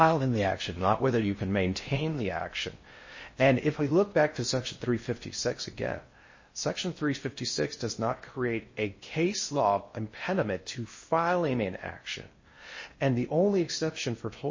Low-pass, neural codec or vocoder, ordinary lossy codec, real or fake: 7.2 kHz; codec, 16 kHz in and 24 kHz out, 0.6 kbps, FocalCodec, streaming, 4096 codes; MP3, 32 kbps; fake